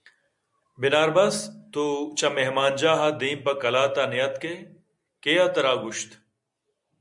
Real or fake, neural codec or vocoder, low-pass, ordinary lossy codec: real; none; 10.8 kHz; MP3, 96 kbps